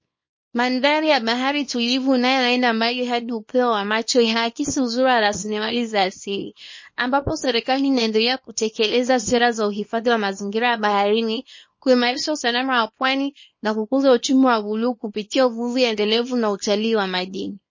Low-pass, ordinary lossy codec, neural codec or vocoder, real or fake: 7.2 kHz; MP3, 32 kbps; codec, 24 kHz, 0.9 kbps, WavTokenizer, small release; fake